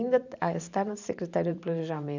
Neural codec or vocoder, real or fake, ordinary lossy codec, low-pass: vocoder, 44.1 kHz, 128 mel bands every 512 samples, BigVGAN v2; fake; none; 7.2 kHz